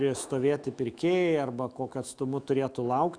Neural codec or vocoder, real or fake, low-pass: autoencoder, 48 kHz, 128 numbers a frame, DAC-VAE, trained on Japanese speech; fake; 9.9 kHz